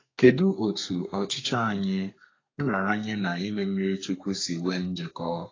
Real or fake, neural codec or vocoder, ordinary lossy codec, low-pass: fake; codec, 32 kHz, 1.9 kbps, SNAC; AAC, 32 kbps; 7.2 kHz